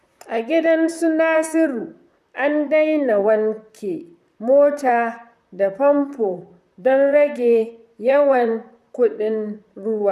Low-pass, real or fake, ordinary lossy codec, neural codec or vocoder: 14.4 kHz; fake; none; vocoder, 44.1 kHz, 128 mel bands, Pupu-Vocoder